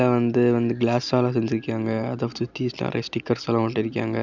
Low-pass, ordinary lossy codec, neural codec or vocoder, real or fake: 7.2 kHz; none; none; real